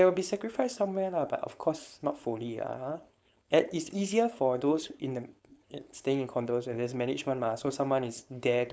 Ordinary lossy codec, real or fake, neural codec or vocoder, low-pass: none; fake; codec, 16 kHz, 4.8 kbps, FACodec; none